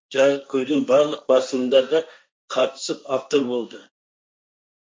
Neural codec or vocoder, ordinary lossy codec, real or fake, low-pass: codec, 16 kHz, 1.1 kbps, Voila-Tokenizer; none; fake; none